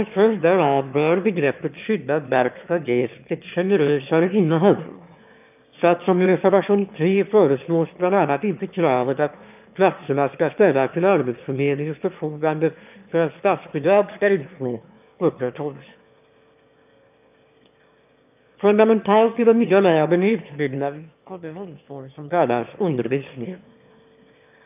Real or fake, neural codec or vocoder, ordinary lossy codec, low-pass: fake; autoencoder, 22.05 kHz, a latent of 192 numbers a frame, VITS, trained on one speaker; none; 3.6 kHz